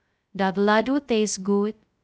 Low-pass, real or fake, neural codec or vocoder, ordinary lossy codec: none; fake; codec, 16 kHz, 0.2 kbps, FocalCodec; none